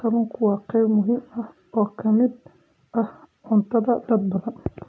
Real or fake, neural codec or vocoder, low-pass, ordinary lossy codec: real; none; none; none